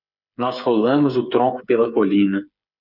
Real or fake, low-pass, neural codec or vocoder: fake; 5.4 kHz; codec, 16 kHz, 8 kbps, FreqCodec, smaller model